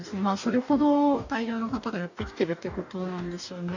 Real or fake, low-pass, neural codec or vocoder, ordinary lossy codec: fake; 7.2 kHz; codec, 44.1 kHz, 2.6 kbps, DAC; none